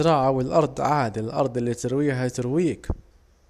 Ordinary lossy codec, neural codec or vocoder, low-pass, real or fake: Opus, 64 kbps; none; 14.4 kHz; real